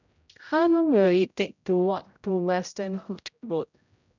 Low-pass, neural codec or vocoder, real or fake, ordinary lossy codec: 7.2 kHz; codec, 16 kHz, 0.5 kbps, X-Codec, HuBERT features, trained on general audio; fake; none